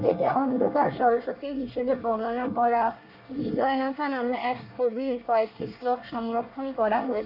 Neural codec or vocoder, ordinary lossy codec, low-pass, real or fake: codec, 24 kHz, 1 kbps, SNAC; none; 5.4 kHz; fake